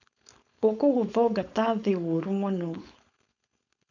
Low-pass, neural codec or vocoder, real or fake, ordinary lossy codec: 7.2 kHz; codec, 16 kHz, 4.8 kbps, FACodec; fake; none